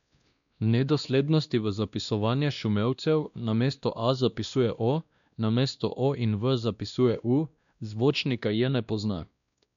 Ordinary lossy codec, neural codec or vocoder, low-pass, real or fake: none; codec, 16 kHz, 1 kbps, X-Codec, WavLM features, trained on Multilingual LibriSpeech; 7.2 kHz; fake